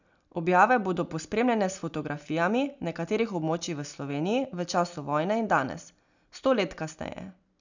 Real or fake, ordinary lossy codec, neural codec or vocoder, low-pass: real; none; none; 7.2 kHz